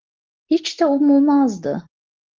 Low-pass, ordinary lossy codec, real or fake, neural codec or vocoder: 7.2 kHz; Opus, 16 kbps; real; none